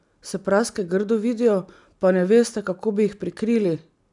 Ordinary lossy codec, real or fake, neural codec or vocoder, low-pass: none; real; none; 10.8 kHz